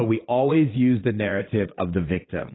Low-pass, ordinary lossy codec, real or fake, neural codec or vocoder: 7.2 kHz; AAC, 16 kbps; fake; vocoder, 22.05 kHz, 80 mel bands, Vocos